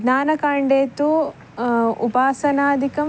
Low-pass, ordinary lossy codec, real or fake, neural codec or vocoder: none; none; real; none